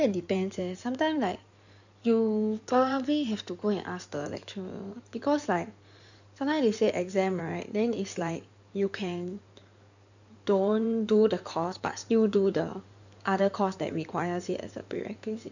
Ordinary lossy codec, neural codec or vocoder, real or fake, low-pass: none; codec, 16 kHz in and 24 kHz out, 2.2 kbps, FireRedTTS-2 codec; fake; 7.2 kHz